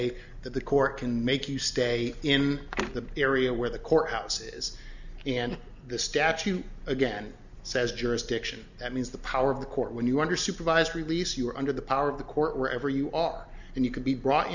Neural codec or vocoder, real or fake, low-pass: none; real; 7.2 kHz